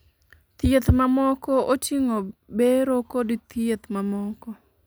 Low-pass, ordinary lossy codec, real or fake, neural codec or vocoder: none; none; real; none